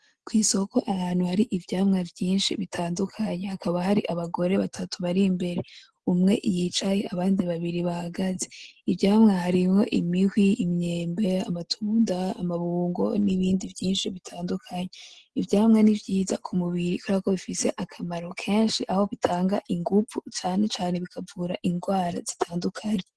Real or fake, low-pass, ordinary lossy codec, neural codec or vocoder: real; 10.8 kHz; Opus, 16 kbps; none